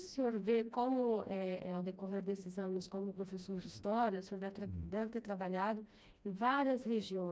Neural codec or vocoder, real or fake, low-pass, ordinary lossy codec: codec, 16 kHz, 1 kbps, FreqCodec, smaller model; fake; none; none